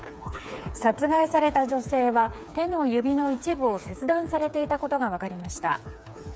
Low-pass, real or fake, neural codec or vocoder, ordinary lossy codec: none; fake; codec, 16 kHz, 4 kbps, FreqCodec, smaller model; none